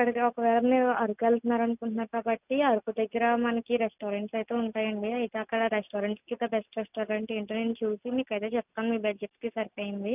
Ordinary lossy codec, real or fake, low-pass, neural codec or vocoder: none; real; 3.6 kHz; none